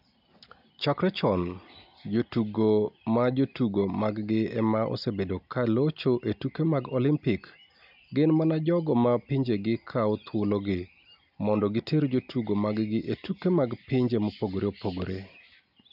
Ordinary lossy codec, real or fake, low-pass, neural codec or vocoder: none; real; 5.4 kHz; none